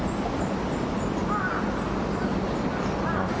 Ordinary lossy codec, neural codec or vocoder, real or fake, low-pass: none; none; real; none